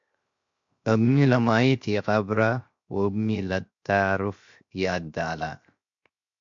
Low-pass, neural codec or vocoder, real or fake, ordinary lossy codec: 7.2 kHz; codec, 16 kHz, 0.7 kbps, FocalCodec; fake; MP3, 48 kbps